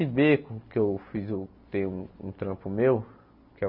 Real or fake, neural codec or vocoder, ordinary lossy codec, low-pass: real; none; none; 5.4 kHz